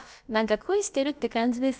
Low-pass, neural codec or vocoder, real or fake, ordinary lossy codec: none; codec, 16 kHz, about 1 kbps, DyCAST, with the encoder's durations; fake; none